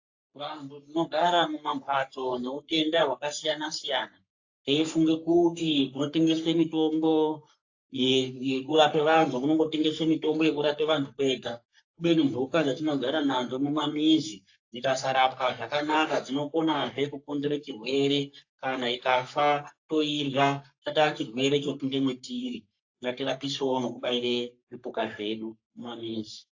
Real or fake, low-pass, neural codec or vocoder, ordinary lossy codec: fake; 7.2 kHz; codec, 44.1 kHz, 3.4 kbps, Pupu-Codec; AAC, 48 kbps